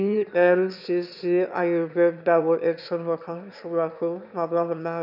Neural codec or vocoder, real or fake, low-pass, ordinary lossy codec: autoencoder, 22.05 kHz, a latent of 192 numbers a frame, VITS, trained on one speaker; fake; 5.4 kHz; none